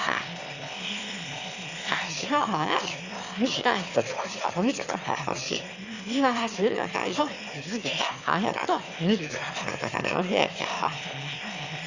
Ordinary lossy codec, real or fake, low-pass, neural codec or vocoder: Opus, 64 kbps; fake; 7.2 kHz; autoencoder, 22.05 kHz, a latent of 192 numbers a frame, VITS, trained on one speaker